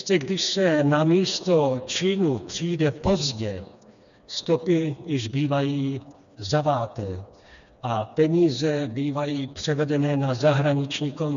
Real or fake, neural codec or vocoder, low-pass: fake; codec, 16 kHz, 2 kbps, FreqCodec, smaller model; 7.2 kHz